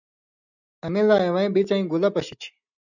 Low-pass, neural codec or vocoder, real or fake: 7.2 kHz; none; real